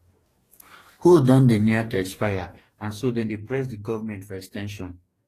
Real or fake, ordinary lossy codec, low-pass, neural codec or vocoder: fake; AAC, 48 kbps; 14.4 kHz; codec, 44.1 kHz, 2.6 kbps, DAC